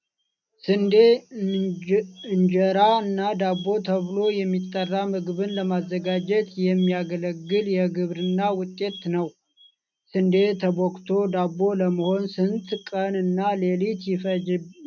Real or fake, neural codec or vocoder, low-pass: real; none; 7.2 kHz